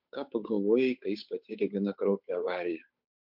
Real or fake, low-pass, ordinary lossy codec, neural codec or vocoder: fake; 5.4 kHz; MP3, 48 kbps; codec, 16 kHz, 8 kbps, FunCodec, trained on Chinese and English, 25 frames a second